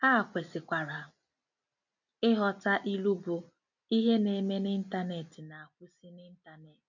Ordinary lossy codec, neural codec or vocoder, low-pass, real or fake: none; none; 7.2 kHz; real